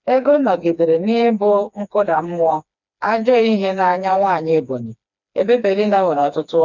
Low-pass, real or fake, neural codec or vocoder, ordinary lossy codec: 7.2 kHz; fake; codec, 16 kHz, 2 kbps, FreqCodec, smaller model; none